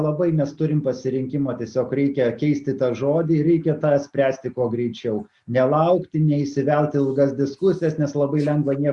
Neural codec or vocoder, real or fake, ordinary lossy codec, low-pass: none; real; Opus, 64 kbps; 10.8 kHz